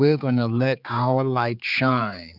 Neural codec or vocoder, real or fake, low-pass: codec, 16 kHz, 4 kbps, X-Codec, HuBERT features, trained on general audio; fake; 5.4 kHz